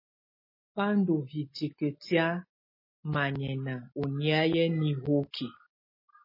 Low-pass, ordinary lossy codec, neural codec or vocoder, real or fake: 5.4 kHz; MP3, 24 kbps; none; real